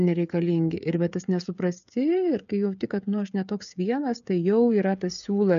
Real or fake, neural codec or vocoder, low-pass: fake; codec, 16 kHz, 16 kbps, FreqCodec, smaller model; 7.2 kHz